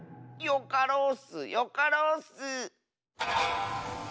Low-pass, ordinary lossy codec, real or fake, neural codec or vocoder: none; none; real; none